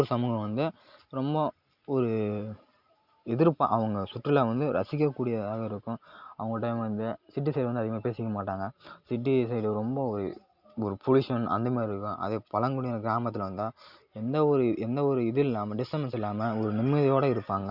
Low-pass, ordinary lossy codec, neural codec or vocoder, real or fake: 5.4 kHz; Opus, 64 kbps; none; real